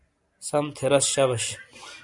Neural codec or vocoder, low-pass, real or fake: none; 10.8 kHz; real